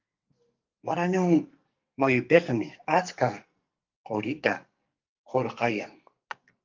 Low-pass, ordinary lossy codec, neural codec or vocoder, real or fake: 7.2 kHz; Opus, 24 kbps; codec, 44.1 kHz, 2.6 kbps, SNAC; fake